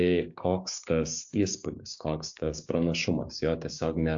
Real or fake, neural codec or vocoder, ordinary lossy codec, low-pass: fake; codec, 16 kHz, 6 kbps, DAC; AAC, 64 kbps; 7.2 kHz